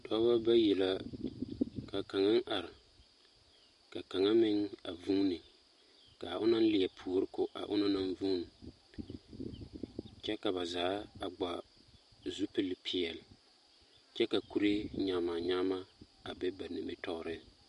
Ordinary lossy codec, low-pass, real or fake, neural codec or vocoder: MP3, 48 kbps; 14.4 kHz; real; none